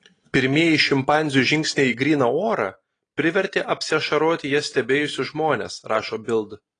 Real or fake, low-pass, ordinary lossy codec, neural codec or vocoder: real; 9.9 kHz; AAC, 32 kbps; none